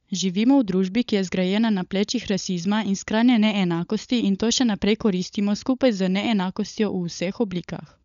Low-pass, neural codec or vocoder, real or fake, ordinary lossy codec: 7.2 kHz; codec, 16 kHz, 16 kbps, FunCodec, trained on LibriTTS, 50 frames a second; fake; none